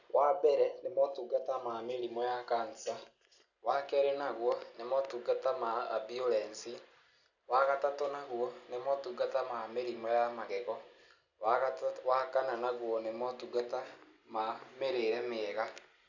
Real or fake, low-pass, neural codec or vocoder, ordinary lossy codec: real; 7.2 kHz; none; none